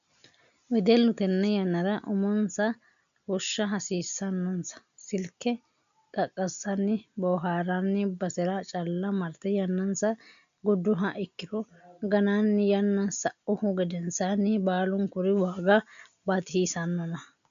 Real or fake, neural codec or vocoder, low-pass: real; none; 7.2 kHz